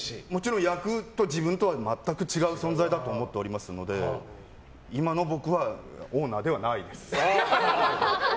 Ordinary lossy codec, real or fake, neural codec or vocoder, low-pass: none; real; none; none